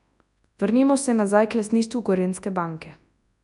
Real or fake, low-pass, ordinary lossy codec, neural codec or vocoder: fake; 10.8 kHz; none; codec, 24 kHz, 0.9 kbps, WavTokenizer, large speech release